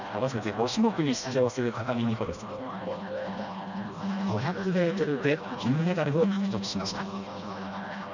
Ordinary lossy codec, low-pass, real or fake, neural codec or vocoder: none; 7.2 kHz; fake; codec, 16 kHz, 1 kbps, FreqCodec, smaller model